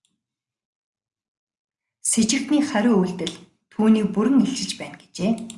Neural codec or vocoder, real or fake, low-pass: none; real; 10.8 kHz